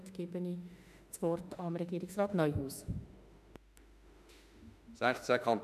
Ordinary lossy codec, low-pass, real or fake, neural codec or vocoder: none; 14.4 kHz; fake; autoencoder, 48 kHz, 32 numbers a frame, DAC-VAE, trained on Japanese speech